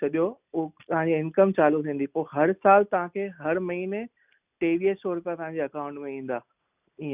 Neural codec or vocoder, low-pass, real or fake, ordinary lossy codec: none; 3.6 kHz; real; none